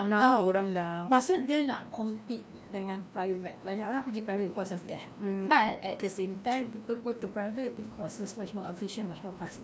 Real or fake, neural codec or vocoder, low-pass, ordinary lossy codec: fake; codec, 16 kHz, 1 kbps, FreqCodec, larger model; none; none